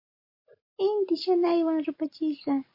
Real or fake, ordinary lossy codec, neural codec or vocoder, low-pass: real; MP3, 32 kbps; none; 5.4 kHz